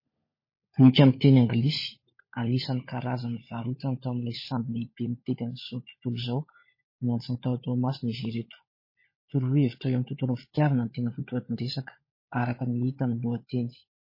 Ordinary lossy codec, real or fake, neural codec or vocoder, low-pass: MP3, 24 kbps; fake; codec, 16 kHz, 16 kbps, FunCodec, trained on LibriTTS, 50 frames a second; 5.4 kHz